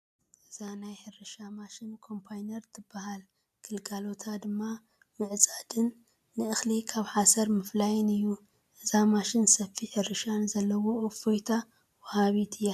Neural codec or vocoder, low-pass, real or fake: none; 14.4 kHz; real